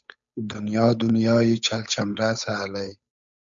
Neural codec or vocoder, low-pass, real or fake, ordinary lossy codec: codec, 16 kHz, 8 kbps, FunCodec, trained on Chinese and English, 25 frames a second; 7.2 kHz; fake; MP3, 64 kbps